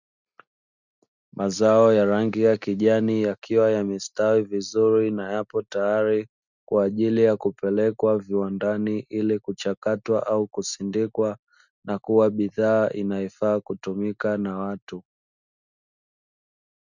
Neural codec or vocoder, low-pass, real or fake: none; 7.2 kHz; real